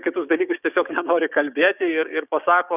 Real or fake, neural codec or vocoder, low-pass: fake; codec, 16 kHz, 6 kbps, DAC; 3.6 kHz